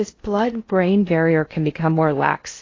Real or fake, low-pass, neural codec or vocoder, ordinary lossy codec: fake; 7.2 kHz; codec, 16 kHz in and 24 kHz out, 0.6 kbps, FocalCodec, streaming, 2048 codes; AAC, 32 kbps